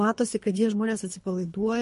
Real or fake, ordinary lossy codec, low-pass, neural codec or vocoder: fake; MP3, 48 kbps; 10.8 kHz; codec, 24 kHz, 3 kbps, HILCodec